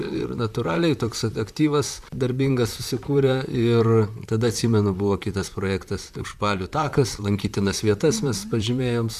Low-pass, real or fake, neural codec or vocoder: 14.4 kHz; fake; vocoder, 44.1 kHz, 128 mel bands, Pupu-Vocoder